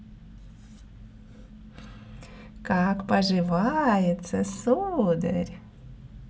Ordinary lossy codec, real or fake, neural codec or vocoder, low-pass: none; real; none; none